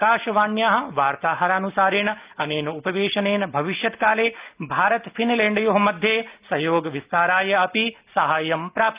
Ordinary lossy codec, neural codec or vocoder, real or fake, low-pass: Opus, 24 kbps; none; real; 3.6 kHz